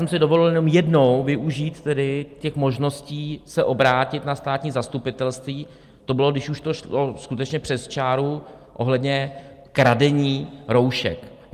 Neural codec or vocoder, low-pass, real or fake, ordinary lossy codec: none; 14.4 kHz; real; Opus, 32 kbps